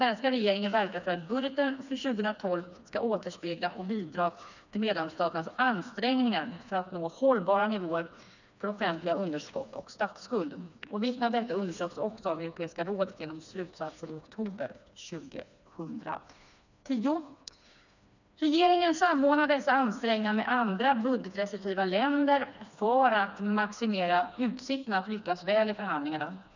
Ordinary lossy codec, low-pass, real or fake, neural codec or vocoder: none; 7.2 kHz; fake; codec, 16 kHz, 2 kbps, FreqCodec, smaller model